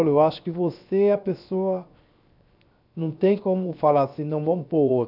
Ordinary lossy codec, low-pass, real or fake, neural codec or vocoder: none; 5.4 kHz; fake; codec, 16 kHz, 0.7 kbps, FocalCodec